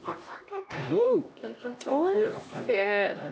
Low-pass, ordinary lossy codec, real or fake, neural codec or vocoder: none; none; fake; codec, 16 kHz, 1 kbps, X-Codec, HuBERT features, trained on LibriSpeech